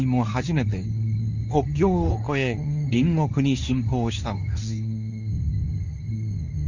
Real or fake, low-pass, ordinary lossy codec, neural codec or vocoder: fake; 7.2 kHz; none; codec, 24 kHz, 0.9 kbps, WavTokenizer, medium speech release version 2